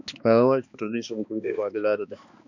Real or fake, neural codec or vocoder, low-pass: fake; codec, 16 kHz, 2 kbps, X-Codec, HuBERT features, trained on balanced general audio; 7.2 kHz